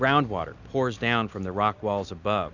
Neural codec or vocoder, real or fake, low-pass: none; real; 7.2 kHz